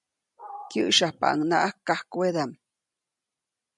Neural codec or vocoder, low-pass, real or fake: none; 10.8 kHz; real